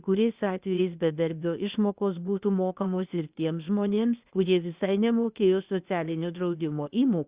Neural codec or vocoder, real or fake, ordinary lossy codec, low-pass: codec, 16 kHz, 0.8 kbps, ZipCodec; fake; Opus, 64 kbps; 3.6 kHz